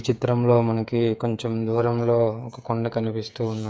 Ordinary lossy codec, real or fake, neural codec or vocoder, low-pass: none; fake; codec, 16 kHz, 8 kbps, FreqCodec, smaller model; none